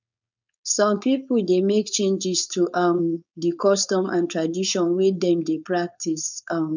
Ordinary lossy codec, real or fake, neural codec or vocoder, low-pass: none; fake; codec, 16 kHz, 4.8 kbps, FACodec; 7.2 kHz